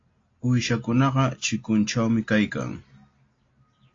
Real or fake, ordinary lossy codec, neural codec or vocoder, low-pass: real; AAC, 32 kbps; none; 7.2 kHz